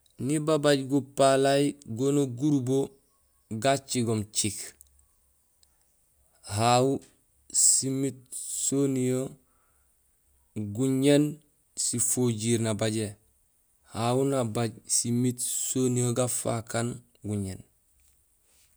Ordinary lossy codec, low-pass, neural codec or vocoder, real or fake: none; none; none; real